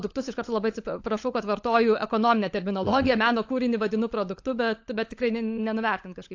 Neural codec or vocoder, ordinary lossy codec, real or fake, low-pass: codec, 16 kHz, 16 kbps, FunCodec, trained on LibriTTS, 50 frames a second; AAC, 48 kbps; fake; 7.2 kHz